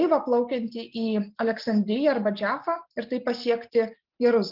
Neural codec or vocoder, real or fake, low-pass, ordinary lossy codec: none; real; 5.4 kHz; Opus, 32 kbps